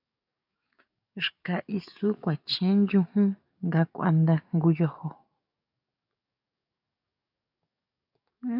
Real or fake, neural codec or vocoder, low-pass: fake; codec, 44.1 kHz, 7.8 kbps, DAC; 5.4 kHz